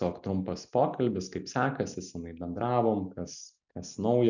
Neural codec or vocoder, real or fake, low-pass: none; real; 7.2 kHz